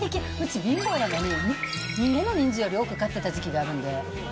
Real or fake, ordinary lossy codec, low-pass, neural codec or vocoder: real; none; none; none